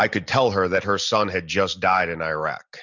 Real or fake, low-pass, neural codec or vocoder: real; 7.2 kHz; none